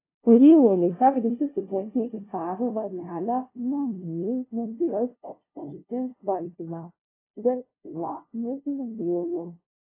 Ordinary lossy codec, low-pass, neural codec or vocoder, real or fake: AAC, 24 kbps; 3.6 kHz; codec, 16 kHz, 0.5 kbps, FunCodec, trained on LibriTTS, 25 frames a second; fake